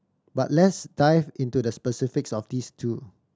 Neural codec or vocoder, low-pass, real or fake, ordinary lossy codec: none; none; real; none